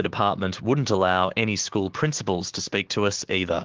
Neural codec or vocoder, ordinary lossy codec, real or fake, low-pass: codec, 16 kHz, 6 kbps, DAC; Opus, 16 kbps; fake; 7.2 kHz